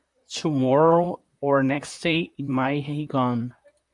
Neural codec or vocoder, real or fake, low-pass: vocoder, 44.1 kHz, 128 mel bands, Pupu-Vocoder; fake; 10.8 kHz